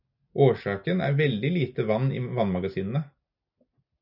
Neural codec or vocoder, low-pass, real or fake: none; 5.4 kHz; real